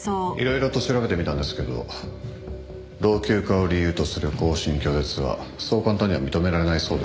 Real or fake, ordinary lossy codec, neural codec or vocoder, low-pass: real; none; none; none